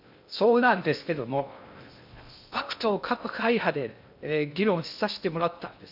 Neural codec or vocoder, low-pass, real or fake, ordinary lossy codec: codec, 16 kHz in and 24 kHz out, 0.6 kbps, FocalCodec, streaming, 2048 codes; 5.4 kHz; fake; none